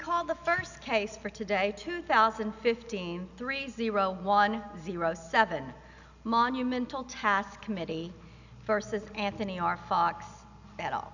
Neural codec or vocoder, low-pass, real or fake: none; 7.2 kHz; real